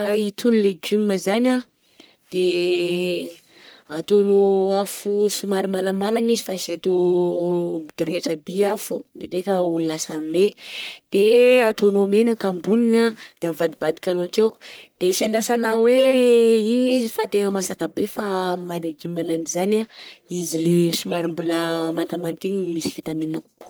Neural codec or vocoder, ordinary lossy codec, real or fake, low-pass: codec, 44.1 kHz, 1.7 kbps, Pupu-Codec; none; fake; none